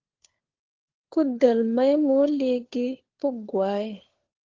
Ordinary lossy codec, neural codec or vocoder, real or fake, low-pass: Opus, 16 kbps; codec, 16 kHz, 4 kbps, FunCodec, trained on LibriTTS, 50 frames a second; fake; 7.2 kHz